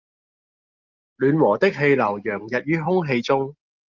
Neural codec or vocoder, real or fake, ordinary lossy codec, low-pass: none; real; Opus, 24 kbps; 7.2 kHz